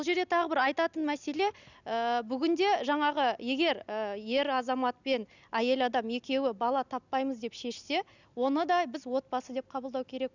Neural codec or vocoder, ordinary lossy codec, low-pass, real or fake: none; none; 7.2 kHz; real